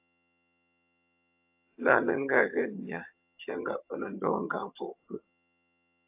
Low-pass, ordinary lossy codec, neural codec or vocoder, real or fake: 3.6 kHz; AAC, 32 kbps; vocoder, 22.05 kHz, 80 mel bands, HiFi-GAN; fake